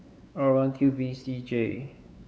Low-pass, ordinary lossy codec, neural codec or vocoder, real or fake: none; none; codec, 16 kHz, 4 kbps, X-Codec, WavLM features, trained on Multilingual LibriSpeech; fake